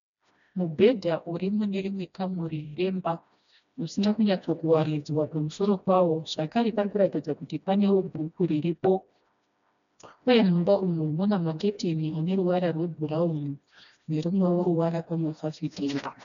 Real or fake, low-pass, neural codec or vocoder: fake; 7.2 kHz; codec, 16 kHz, 1 kbps, FreqCodec, smaller model